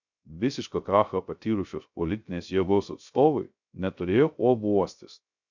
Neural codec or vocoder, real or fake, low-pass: codec, 16 kHz, 0.3 kbps, FocalCodec; fake; 7.2 kHz